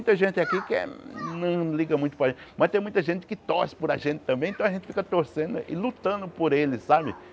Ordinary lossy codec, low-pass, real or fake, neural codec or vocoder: none; none; real; none